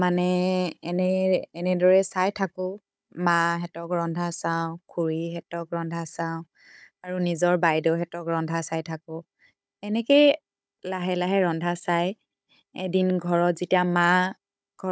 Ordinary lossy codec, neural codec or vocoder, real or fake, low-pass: none; codec, 16 kHz, 16 kbps, FunCodec, trained on Chinese and English, 50 frames a second; fake; none